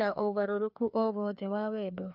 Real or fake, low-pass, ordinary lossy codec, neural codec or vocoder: fake; 5.4 kHz; none; codec, 16 kHz, 2 kbps, FreqCodec, larger model